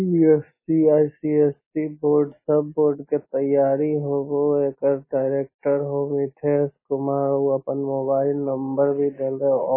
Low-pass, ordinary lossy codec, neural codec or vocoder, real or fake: 3.6 kHz; MP3, 16 kbps; none; real